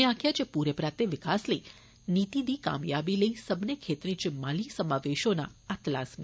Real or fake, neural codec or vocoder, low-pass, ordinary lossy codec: real; none; none; none